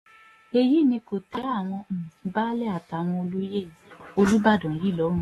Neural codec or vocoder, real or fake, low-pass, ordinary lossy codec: autoencoder, 48 kHz, 128 numbers a frame, DAC-VAE, trained on Japanese speech; fake; 19.8 kHz; AAC, 32 kbps